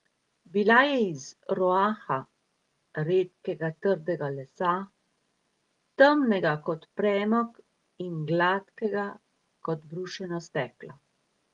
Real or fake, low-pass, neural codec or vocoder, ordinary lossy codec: real; 10.8 kHz; none; Opus, 24 kbps